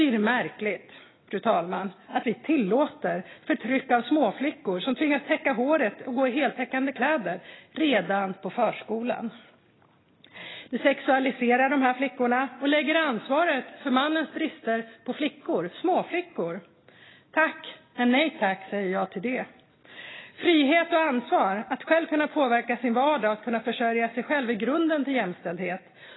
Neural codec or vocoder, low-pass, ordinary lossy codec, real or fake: none; 7.2 kHz; AAC, 16 kbps; real